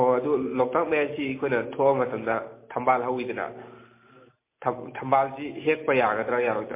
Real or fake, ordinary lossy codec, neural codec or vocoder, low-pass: real; MP3, 24 kbps; none; 3.6 kHz